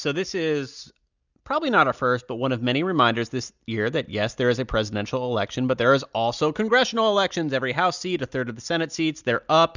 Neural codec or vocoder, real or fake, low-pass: none; real; 7.2 kHz